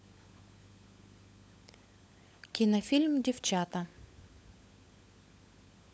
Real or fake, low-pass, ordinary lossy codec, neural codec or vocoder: fake; none; none; codec, 16 kHz, 16 kbps, FunCodec, trained on LibriTTS, 50 frames a second